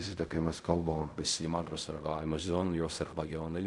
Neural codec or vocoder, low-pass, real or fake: codec, 16 kHz in and 24 kHz out, 0.4 kbps, LongCat-Audio-Codec, fine tuned four codebook decoder; 10.8 kHz; fake